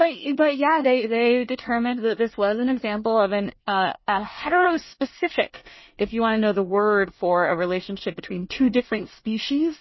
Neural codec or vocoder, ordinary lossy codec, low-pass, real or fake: codec, 24 kHz, 1 kbps, SNAC; MP3, 24 kbps; 7.2 kHz; fake